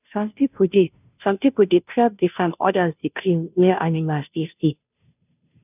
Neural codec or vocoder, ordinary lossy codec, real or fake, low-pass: codec, 16 kHz, 0.5 kbps, FunCodec, trained on Chinese and English, 25 frames a second; none; fake; 3.6 kHz